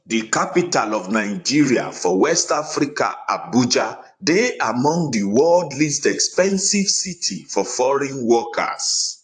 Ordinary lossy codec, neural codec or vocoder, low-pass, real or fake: AAC, 64 kbps; vocoder, 44.1 kHz, 128 mel bands, Pupu-Vocoder; 10.8 kHz; fake